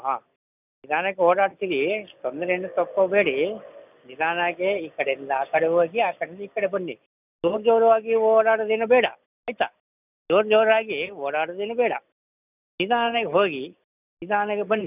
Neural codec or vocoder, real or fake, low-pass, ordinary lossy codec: none; real; 3.6 kHz; none